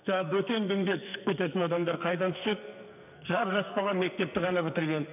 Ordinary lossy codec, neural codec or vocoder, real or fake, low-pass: none; codec, 44.1 kHz, 2.6 kbps, SNAC; fake; 3.6 kHz